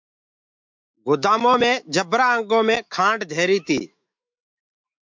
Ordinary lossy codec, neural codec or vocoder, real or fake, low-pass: MP3, 64 kbps; autoencoder, 48 kHz, 128 numbers a frame, DAC-VAE, trained on Japanese speech; fake; 7.2 kHz